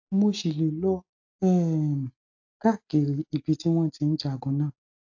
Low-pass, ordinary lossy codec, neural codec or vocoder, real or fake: 7.2 kHz; AAC, 48 kbps; none; real